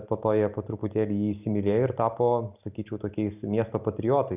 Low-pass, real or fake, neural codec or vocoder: 3.6 kHz; real; none